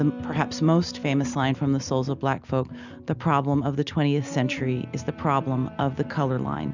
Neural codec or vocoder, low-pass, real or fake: none; 7.2 kHz; real